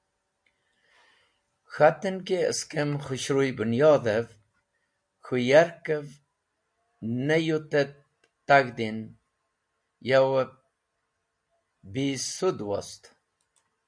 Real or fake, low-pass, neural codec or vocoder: real; 9.9 kHz; none